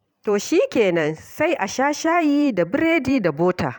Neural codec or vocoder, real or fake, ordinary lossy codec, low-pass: vocoder, 44.1 kHz, 128 mel bands every 512 samples, BigVGAN v2; fake; none; 19.8 kHz